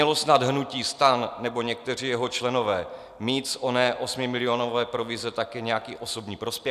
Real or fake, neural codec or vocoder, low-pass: real; none; 14.4 kHz